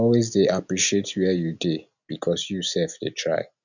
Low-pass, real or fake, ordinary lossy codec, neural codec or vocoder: 7.2 kHz; real; none; none